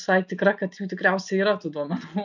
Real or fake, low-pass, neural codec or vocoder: real; 7.2 kHz; none